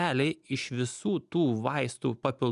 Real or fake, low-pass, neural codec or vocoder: real; 10.8 kHz; none